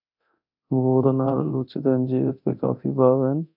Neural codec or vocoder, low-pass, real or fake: codec, 24 kHz, 0.9 kbps, DualCodec; 5.4 kHz; fake